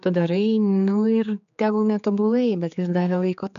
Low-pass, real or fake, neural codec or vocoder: 7.2 kHz; fake; codec, 16 kHz, 4 kbps, X-Codec, HuBERT features, trained on general audio